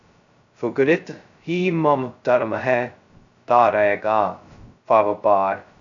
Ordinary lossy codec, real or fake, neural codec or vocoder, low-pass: AAC, 64 kbps; fake; codec, 16 kHz, 0.2 kbps, FocalCodec; 7.2 kHz